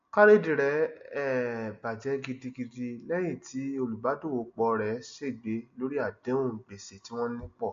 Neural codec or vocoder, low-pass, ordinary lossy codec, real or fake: none; 7.2 kHz; MP3, 48 kbps; real